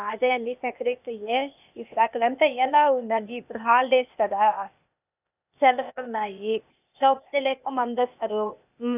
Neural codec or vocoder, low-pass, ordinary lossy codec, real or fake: codec, 16 kHz, 0.8 kbps, ZipCodec; 3.6 kHz; none; fake